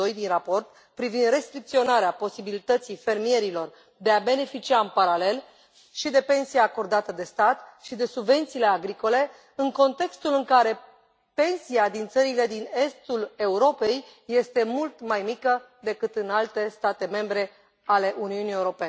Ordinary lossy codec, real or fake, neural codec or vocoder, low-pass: none; real; none; none